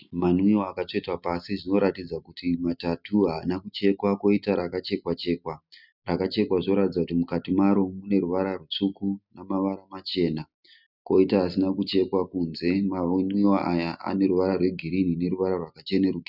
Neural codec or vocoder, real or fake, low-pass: none; real; 5.4 kHz